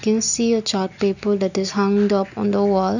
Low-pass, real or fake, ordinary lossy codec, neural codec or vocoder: 7.2 kHz; real; none; none